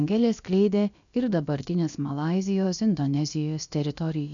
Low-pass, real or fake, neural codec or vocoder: 7.2 kHz; fake; codec, 16 kHz, about 1 kbps, DyCAST, with the encoder's durations